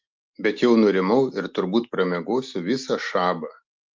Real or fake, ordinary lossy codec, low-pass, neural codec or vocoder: real; Opus, 24 kbps; 7.2 kHz; none